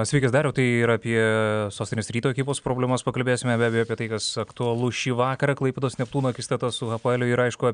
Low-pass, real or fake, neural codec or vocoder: 9.9 kHz; real; none